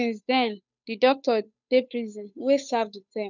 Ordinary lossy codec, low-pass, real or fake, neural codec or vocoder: none; 7.2 kHz; fake; codec, 16 kHz, 8 kbps, FunCodec, trained on Chinese and English, 25 frames a second